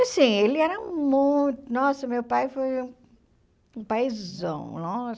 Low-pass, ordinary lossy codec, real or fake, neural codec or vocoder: none; none; real; none